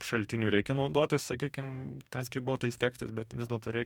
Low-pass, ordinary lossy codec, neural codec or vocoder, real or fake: 19.8 kHz; MP3, 96 kbps; codec, 44.1 kHz, 2.6 kbps, DAC; fake